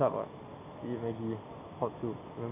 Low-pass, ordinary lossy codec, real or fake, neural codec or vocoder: 3.6 kHz; AAC, 16 kbps; real; none